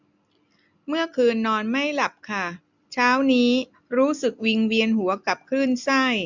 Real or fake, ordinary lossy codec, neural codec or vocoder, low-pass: real; none; none; 7.2 kHz